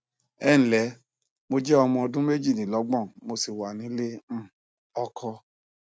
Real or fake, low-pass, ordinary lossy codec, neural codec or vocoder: real; none; none; none